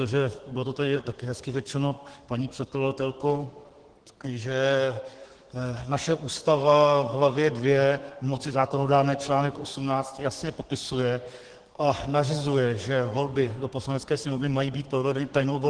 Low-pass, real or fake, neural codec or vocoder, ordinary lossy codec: 9.9 kHz; fake; codec, 32 kHz, 1.9 kbps, SNAC; Opus, 16 kbps